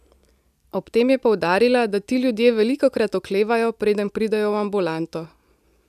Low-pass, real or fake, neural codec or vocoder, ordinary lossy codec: 14.4 kHz; real; none; none